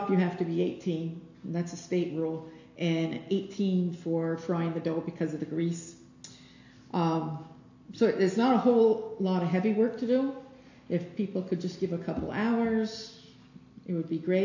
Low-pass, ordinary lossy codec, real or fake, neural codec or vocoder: 7.2 kHz; AAC, 48 kbps; real; none